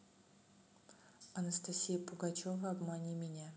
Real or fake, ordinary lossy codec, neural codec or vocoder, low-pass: real; none; none; none